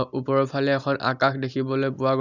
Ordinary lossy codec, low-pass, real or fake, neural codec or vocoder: none; 7.2 kHz; real; none